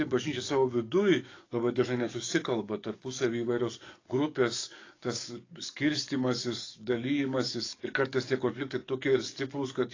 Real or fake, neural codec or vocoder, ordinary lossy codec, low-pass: fake; codec, 44.1 kHz, 7.8 kbps, DAC; AAC, 32 kbps; 7.2 kHz